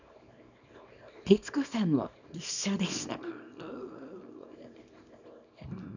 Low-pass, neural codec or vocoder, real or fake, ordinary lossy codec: 7.2 kHz; codec, 24 kHz, 0.9 kbps, WavTokenizer, small release; fake; none